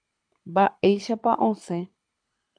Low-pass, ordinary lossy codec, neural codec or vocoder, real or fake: 9.9 kHz; AAC, 64 kbps; codec, 24 kHz, 6 kbps, HILCodec; fake